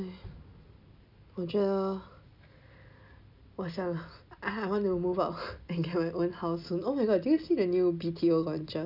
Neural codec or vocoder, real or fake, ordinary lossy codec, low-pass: none; real; none; 5.4 kHz